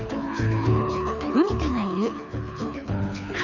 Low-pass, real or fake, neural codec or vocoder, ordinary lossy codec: 7.2 kHz; fake; codec, 24 kHz, 6 kbps, HILCodec; none